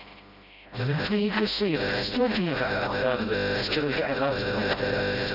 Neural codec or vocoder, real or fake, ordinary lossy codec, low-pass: codec, 16 kHz, 0.5 kbps, FreqCodec, smaller model; fake; none; 5.4 kHz